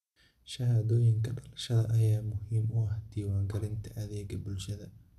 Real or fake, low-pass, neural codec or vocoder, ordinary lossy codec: real; 14.4 kHz; none; none